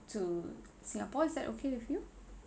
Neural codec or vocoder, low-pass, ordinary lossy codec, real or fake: none; none; none; real